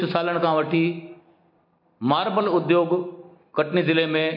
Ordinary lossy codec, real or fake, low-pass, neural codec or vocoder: MP3, 32 kbps; real; 5.4 kHz; none